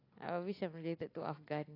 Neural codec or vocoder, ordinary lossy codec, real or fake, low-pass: none; AAC, 24 kbps; real; 5.4 kHz